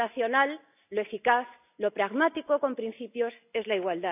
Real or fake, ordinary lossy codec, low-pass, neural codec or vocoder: real; none; 3.6 kHz; none